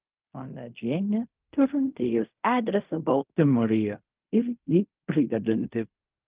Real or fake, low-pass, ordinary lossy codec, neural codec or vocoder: fake; 3.6 kHz; Opus, 32 kbps; codec, 16 kHz in and 24 kHz out, 0.4 kbps, LongCat-Audio-Codec, fine tuned four codebook decoder